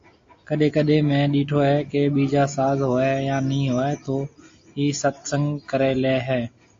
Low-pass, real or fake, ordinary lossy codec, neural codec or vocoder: 7.2 kHz; real; AAC, 48 kbps; none